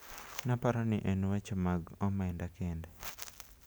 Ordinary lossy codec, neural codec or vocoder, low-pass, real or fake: none; none; none; real